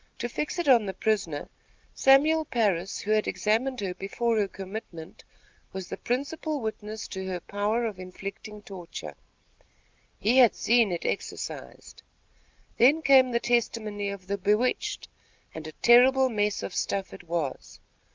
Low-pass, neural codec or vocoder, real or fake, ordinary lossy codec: 7.2 kHz; none; real; Opus, 32 kbps